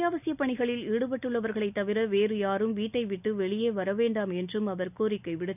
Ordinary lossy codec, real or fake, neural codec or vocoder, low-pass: none; real; none; 3.6 kHz